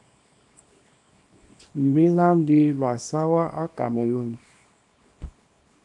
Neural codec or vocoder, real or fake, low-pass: codec, 24 kHz, 0.9 kbps, WavTokenizer, small release; fake; 10.8 kHz